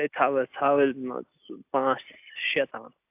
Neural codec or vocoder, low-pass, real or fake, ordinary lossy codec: none; 3.6 kHz; real; AAC, 32 kbps